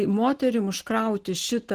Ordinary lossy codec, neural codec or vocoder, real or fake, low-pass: Opus, 16 kbps; none; real; 14.4 kHz